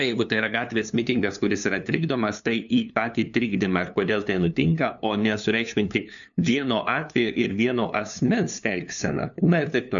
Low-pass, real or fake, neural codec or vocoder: 7.2 kHz; fake; codec, 16 kHz, 2 kbps, FunCodec, trained on LibriTTS, 25 frames a second